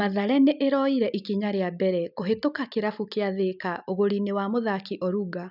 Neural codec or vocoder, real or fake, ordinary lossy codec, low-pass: none; real; none; 5.4 kHz